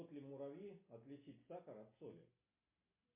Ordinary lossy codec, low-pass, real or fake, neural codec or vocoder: MP3, 24 kbps; 3.6 kHz; real; none